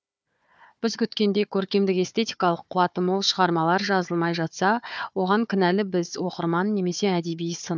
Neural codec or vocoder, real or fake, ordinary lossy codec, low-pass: codec, 16 kHz, 4 kbps, FunCodec, trained on Chinese and English, 50 frames a second; fake; none; none